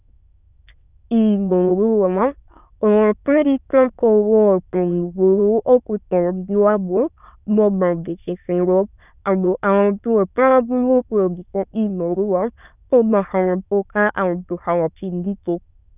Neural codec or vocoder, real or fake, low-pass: autoencoder, 22.05 kHz, a latent of 192 numbers a frame, VITS, trained on many speakers; fake; 3.6 kHz